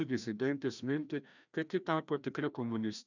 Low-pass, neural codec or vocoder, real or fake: 7.2 kHz; codec, 16 kHz, 1 kbps, FreqCodec, larger model; fake